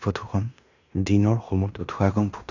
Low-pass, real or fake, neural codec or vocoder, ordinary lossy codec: 7.2 kHz; fake; codec, 16 kHz in and 24 kHz out, 0.9 kbps, LongCat-Audio-Codec, fine tuned four codebook decoder; none